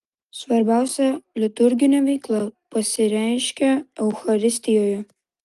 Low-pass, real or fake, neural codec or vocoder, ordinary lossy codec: 14.4 kHz; real; none; Opus, 32 kbps